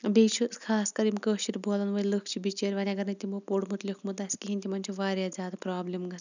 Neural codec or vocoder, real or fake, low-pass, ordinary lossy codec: none; real; 7.2 kHz; none